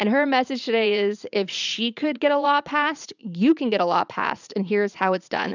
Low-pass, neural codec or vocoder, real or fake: 7.2 kHz; vocoder, 44.1 kHz, 80 mel bands, Vocos; fake